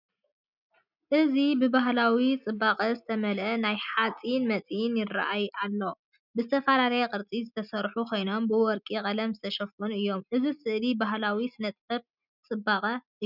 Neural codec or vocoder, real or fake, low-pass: none; real; 5.4 kHz